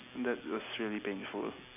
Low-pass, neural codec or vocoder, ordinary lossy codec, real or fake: 3.6 kHz; none; none; real